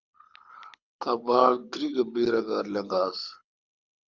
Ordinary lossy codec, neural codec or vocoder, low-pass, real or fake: Opus, 64 kbps; codec, 24 kHz, 6 kbps, HILCodec; 7.2 kHz; fake